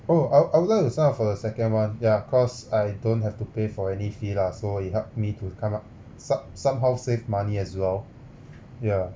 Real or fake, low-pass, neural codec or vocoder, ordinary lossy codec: real; none; none; none